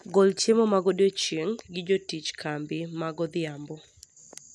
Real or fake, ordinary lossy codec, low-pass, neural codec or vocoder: real; none; none; none